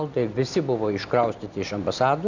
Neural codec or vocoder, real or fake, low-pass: none; real; 7.2 kHz